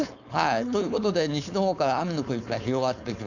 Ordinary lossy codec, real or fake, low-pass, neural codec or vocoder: none; fake; 7.2 kHz; codec, 16 kHz, 4.8 kbps, FACodec